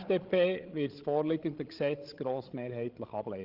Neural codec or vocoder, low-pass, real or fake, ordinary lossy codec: codec, 16 kHz, 16 kbps, FreqCodec, larger model; 5.4 kHz; fake; Opus, 16 kbps